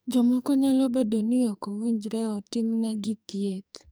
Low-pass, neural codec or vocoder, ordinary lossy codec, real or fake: none; codec, 44.1 kHz, 2.6 kbps, SNAC; none; fake